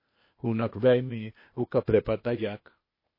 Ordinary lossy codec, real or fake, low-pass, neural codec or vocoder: MP3, 24 kbps; fake; 5.4 kHz; codec, 16 kHz, 0.8 kbps, ZipCodec